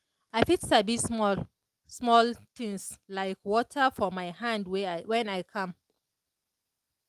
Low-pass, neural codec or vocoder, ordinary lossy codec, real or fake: 14.4 kHz; none; Opus, 32 kbps; real